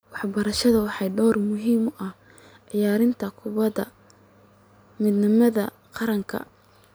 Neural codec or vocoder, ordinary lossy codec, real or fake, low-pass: none; none; real; none